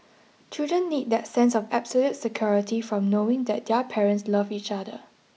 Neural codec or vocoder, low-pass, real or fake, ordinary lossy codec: none; none; real; none